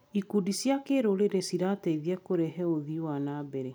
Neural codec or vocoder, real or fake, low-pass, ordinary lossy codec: none; real; none; none